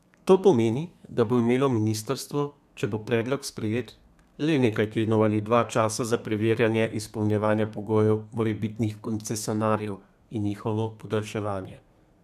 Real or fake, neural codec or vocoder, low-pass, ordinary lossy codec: fake; codec, 32 kHz, 1.9 kbps, SNAC; 14.4 kHz; none